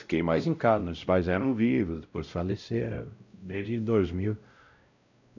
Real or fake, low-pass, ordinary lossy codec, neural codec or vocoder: fake; 7.2 kHz; none; codec, 16 kHz, 0.5 kbps, X-Codec, WavLM features, trained on Multilingual LibriSpeech